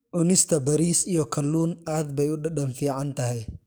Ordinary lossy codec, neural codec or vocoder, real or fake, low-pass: none; codec, 44.1 kHz, 7.8 kbps, DAC; fake; none